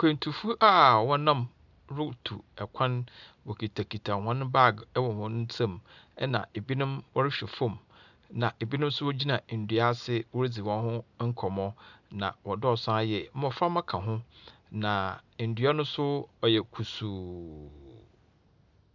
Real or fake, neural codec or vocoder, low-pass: real; none; 7.2 kHz